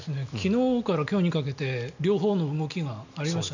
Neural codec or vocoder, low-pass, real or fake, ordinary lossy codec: none; 7.2 kHz; real; none